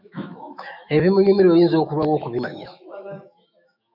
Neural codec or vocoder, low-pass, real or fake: autoencoder, 48 kHz, 128 numbers a frame, DAC-VAE, trained on Japanese speech; 5.4 kHz; fake